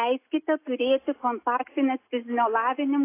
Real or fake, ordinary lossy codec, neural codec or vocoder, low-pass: real; AAC, 24 kbps; none; 3.6 kHz